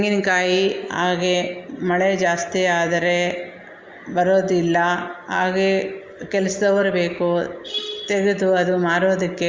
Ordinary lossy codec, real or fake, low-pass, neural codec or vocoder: Opus, 32 kbps; real; 7.2 kHz; none